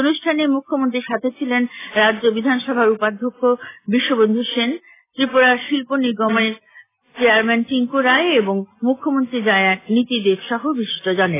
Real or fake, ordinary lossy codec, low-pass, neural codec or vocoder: real; AAC, 16 kbps; 3.6 kHz; none